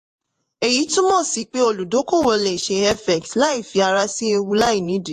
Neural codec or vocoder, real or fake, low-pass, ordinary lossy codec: vocoder, 48 kHz, 128 mel bands, Vocos; fake; 14.4 kHz; AAC, 64 kbps